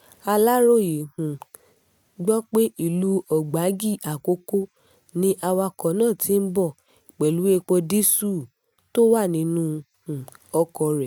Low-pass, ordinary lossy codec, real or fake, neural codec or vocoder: none; none; real; none